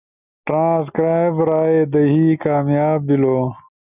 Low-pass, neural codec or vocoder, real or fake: 3.6 kHz; none; real